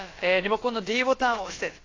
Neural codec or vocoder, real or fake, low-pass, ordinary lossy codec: codec, 16 kHz, about 1 kbps, DyCAST, with the encoder's durations; fake; 7.2 kHz; AAC, 32 kbps